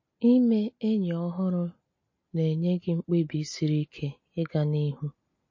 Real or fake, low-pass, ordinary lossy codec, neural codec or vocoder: real; 7.2 kHz; MP3, 32 kbps; none